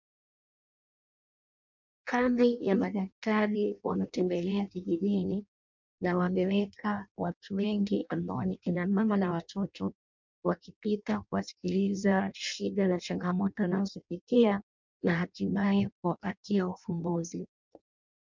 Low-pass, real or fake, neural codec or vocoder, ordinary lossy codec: 7.2 kHz; fake; codec, 16 kHz in and 24 kHz out, 0.6 kbps, FireRedTTS-2 codec; MP3, 64 kbps